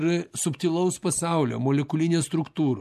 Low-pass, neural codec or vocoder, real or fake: 14.4 kHz; none; real